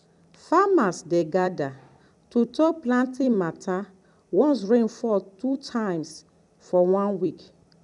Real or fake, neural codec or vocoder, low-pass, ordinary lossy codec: fake; vocoder, 44.1 kHz, 128 mel bands every 256 samples, BigVGAN v2; 10.8 kHz; none